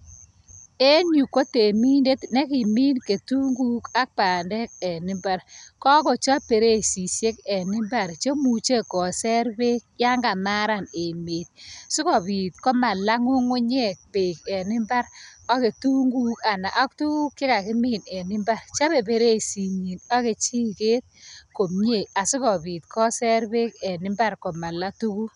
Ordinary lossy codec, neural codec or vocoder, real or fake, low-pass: none; none; real; 10.8 kHz